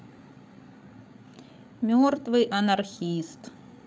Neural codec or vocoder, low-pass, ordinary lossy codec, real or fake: codec, 16 kHz, 8 kbps, FreqCodec, larger model; none; none; fake